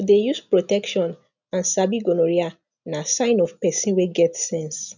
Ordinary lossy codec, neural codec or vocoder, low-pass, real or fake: none; none; 7.2 kHz; real